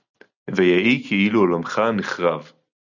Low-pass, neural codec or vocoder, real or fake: 7.2 kHz; none; real